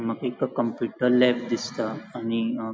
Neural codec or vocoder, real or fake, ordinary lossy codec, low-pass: none; real; none; none